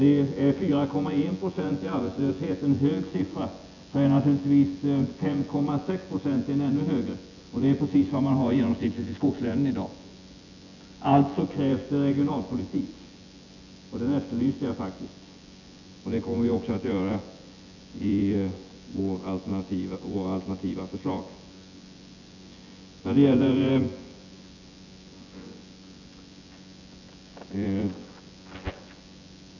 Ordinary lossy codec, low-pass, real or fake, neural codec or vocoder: none; 7.2 kHz; fake; vocoder, 24 kHz, 100 mel bands, Vocos